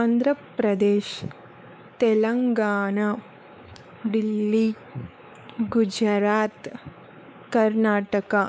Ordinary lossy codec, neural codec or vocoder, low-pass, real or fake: none; codec, 16 kHz, 4 kbps, X-Codec, WavLM features, trained on Multilingual LibriSpeech; none; fake